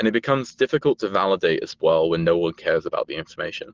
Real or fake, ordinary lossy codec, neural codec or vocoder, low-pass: real; Opus, 16 kbps; none; 7.2 kHz